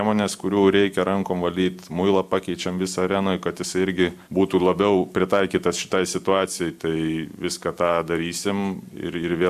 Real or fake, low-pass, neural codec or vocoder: real; 14.4 kHz; none